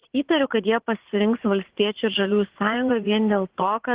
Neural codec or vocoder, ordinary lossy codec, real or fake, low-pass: vocoder, 44.1 kHz, 80 mel bands, Vocos; Opus, 16 kbps; fake; 3.6 kHz